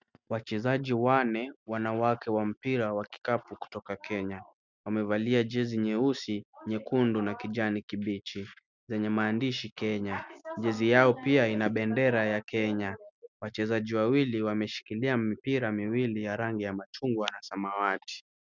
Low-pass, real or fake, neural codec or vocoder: 7.2 kHz; real; none